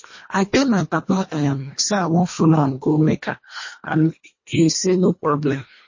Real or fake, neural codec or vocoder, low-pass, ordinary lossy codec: fake; codec, 24 kHz, 1.5 kbps, HILCodec; 7.2 kHz; MP3, 32 kbps